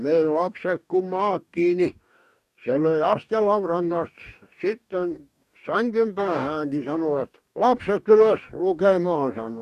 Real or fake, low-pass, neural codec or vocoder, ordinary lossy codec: fake; 14.4 kHz; codec, 44.1 kHz, 2.6 kbps, DAC; none